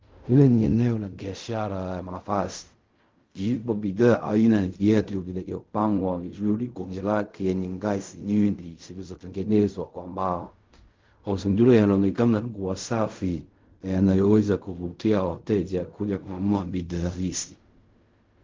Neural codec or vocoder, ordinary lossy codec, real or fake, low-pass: codec, 16 kHz in and 24 kHz out, 0.4 kbps, LongCat-Audio-Codec, fine tuned four codebook decoder; Opus, 24 kbps; fake; 7.2 kHz